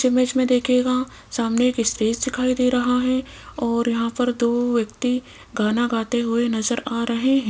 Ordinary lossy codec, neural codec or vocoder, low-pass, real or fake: none; none; none; real